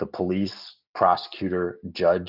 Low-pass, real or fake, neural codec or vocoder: 5.4 kHz; real; none